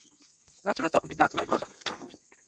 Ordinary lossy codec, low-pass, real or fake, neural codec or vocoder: Opus, 64 kbps; 9.9 kHz; fake; codec, 32 kHz, 1.9 kbps, SNAC